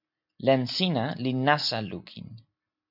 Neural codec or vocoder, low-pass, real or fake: none; 5.4 kHz; real